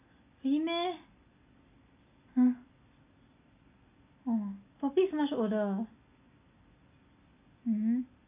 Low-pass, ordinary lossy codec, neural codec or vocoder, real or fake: 3.6 kHz; AAC, 24 kbps; none; real